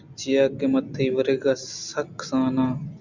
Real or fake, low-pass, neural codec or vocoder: real; 7.2 kHz; none